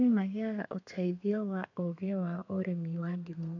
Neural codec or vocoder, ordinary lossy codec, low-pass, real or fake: codec, 32 kHz, 1.9 kbps, SNAC; none; 7.2 kHz; fake